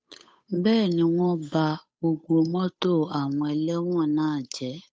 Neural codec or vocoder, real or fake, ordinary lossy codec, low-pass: codec, 16 kHz, 8 kbps, FunCodec, trained on Chinese and English, 25 frames a second; fake; none; none